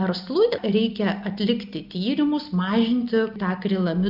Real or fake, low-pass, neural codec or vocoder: real; 5.4 kHz; none